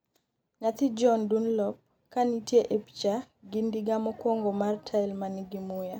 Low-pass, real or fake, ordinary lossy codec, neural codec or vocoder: 19.8 kHz; real; none; none